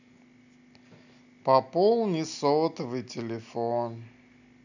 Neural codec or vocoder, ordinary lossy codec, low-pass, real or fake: none; none; 7.2 kHz; real